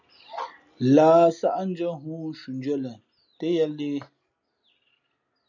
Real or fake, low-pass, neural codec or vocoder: real; 7.2 kHz; none